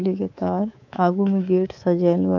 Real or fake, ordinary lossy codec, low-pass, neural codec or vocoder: fake; none; 7.2 kHz; codec, 24 kHz, 3.1 kbps, DualCodec